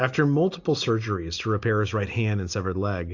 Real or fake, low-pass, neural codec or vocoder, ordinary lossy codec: real; 7.2 kHz; none; AAC, 48 kbps